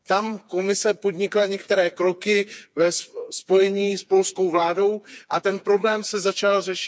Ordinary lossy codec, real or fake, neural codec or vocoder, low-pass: none; fake; codec, 16 kHz, 4 kbps, FreqCodec, smaller model; none